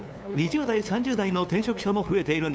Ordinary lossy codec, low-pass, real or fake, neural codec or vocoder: none; none; fake; codec, 16 kHz, 2 kbps, FunCodec, trained on LibriTTS, 25 frames a second